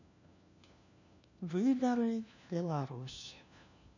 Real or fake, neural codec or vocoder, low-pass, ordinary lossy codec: fake; codec, 16 kHz, 1 kbps, FunCodec, trained on LibriTTS, 50 frames a second; 7.2 kHz; none